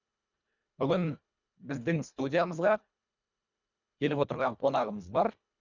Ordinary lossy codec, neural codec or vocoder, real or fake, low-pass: none; codec, 24 kHz, 1.5 kbps, HILCodec; fake; 7.2 kHz